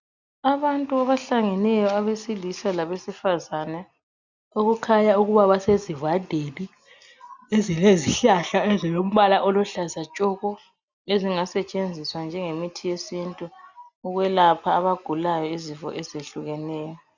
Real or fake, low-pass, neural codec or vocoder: real; 7.2 kHz; none